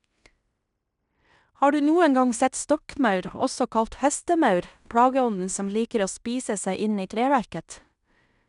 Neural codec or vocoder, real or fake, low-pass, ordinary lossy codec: codec, 16 kHz in and 24 kHz out, 0.9 kbps, LongCat-Audio-Codec, fine tuned four codebook decoder; fake; 10.8 kHz; none